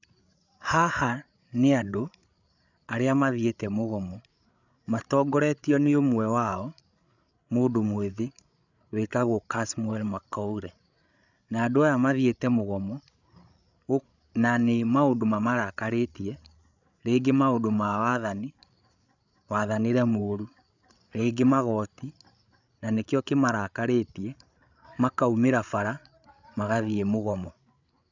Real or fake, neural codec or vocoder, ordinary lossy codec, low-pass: fake; codec, 16 kHz, 8 kbps, FreqCodec, larger model; none; 7.2 kHz